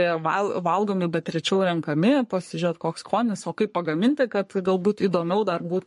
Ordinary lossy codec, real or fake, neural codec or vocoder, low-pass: MP3, 48 kbps; fake; codec, 44.1 kHz, 3.4 kbps, Pupu-Codec; 14.4 kHz